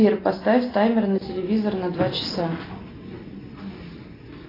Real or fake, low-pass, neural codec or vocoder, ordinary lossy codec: real; 5.4 kHz; none; AAC, 24 kbps